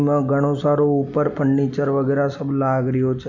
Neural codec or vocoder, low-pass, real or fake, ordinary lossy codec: none; 7.2 kHz; real; none